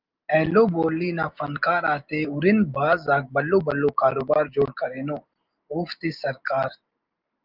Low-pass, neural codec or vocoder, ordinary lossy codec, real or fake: 5.4 kHz; none; Opus, 24 kbps; real